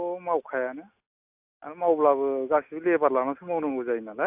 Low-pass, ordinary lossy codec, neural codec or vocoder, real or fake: 3.6 kHz; AAC, 32 kbps; none; real